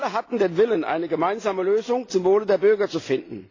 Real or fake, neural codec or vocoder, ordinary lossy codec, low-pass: real; none; AAC, 32 kbps; 7.2 kHz